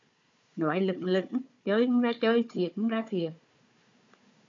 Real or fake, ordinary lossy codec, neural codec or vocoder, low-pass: fake; MP3, 48 kbps; codec, 16 kHz, 4 kbps, FunCodec, trained on Chinese and English, 50 frames a second; 7.2 kHz